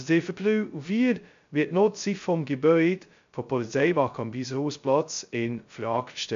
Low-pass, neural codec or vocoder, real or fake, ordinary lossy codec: 7.2 kHz; codec, 16 kHz, 0.2 kbps, FocalCodec; fake; MP3, 64 kbps